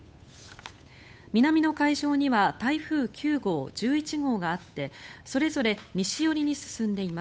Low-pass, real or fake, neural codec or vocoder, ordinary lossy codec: none; fake; codec, 16 kHz, 8 kbps, FunCodec, trained on Chinese and English, 25 frames a second; none